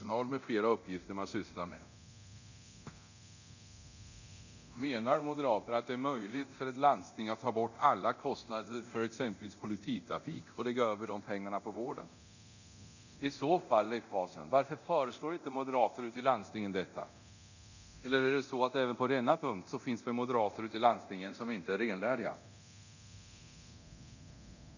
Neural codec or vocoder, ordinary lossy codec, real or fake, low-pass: codec, 24 kHz, 0.9 kbps, DualCodec; none; fake; 7.2 kHz